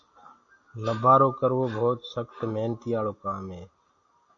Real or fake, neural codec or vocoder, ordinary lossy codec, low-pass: real; none; AAC, 48 kbps; 7.2 kHz